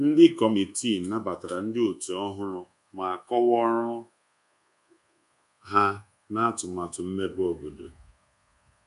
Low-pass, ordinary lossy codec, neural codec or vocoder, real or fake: 10.8 kHz; none; codec, 24 kHz, 1.2 kbps, DualCodec; fake